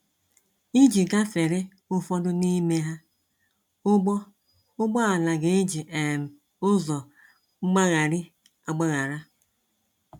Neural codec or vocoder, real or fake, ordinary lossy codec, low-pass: none; real; none; 19.8 kHz